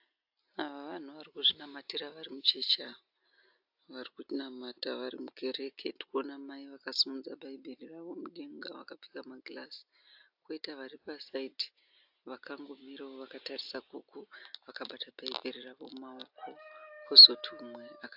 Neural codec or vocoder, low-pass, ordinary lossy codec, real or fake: none; 5.4 kHz; AAC, 48 kbps; real